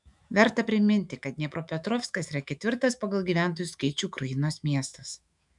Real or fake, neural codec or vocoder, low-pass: fake; autoencoder, 48 kHz, 128 numbers a frame, DAC-VAE, trained on Japanese speech; 10.8 kHz